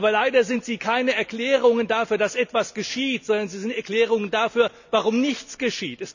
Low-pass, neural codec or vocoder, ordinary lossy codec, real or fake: 7.2 kHz; none; none; real